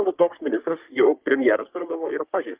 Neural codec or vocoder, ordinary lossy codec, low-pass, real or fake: codec, 44.1 kHz, 3.4 kbps, Pupu-Codec; Opus, 24 kbps; 3.6 kHz; fake